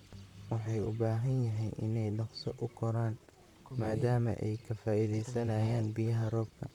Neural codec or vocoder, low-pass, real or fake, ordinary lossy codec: vocoder, 44.1 kHz, 128 mel bands, Pupu-Vocoder; 19.8 kHz; fake; none